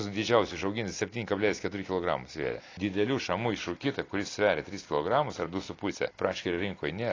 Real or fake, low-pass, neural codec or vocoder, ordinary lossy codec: real; 7.2 kHz; none; AAC, 32 kbps